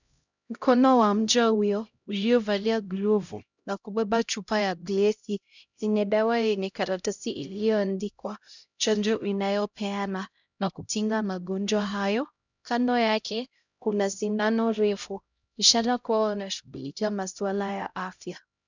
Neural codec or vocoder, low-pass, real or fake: codec, 16 kHz, 0.5 kbps, X-Codec, HuBERT features, trained on LibriSpeech; 7.2 kHz; fake